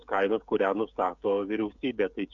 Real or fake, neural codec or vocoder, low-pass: fake; codec, 16 kHz, 16 kbps, FreqCodec, smaller model; 7.2 kHz